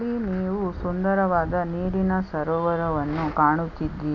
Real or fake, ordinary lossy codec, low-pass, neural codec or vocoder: real; none; 7.2 kHz; none